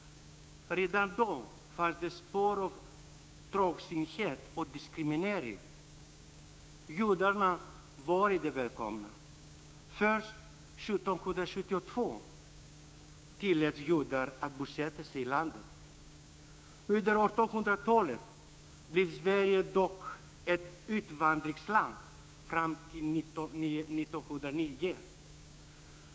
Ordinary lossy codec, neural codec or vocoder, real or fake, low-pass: none; codec, 16 kHz, 6 kbps, DAC; fake; none